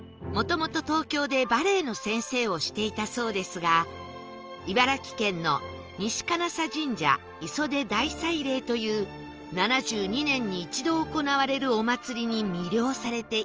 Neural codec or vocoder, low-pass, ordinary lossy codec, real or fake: none; 7.2 kHz; Opus, 24 kbps; real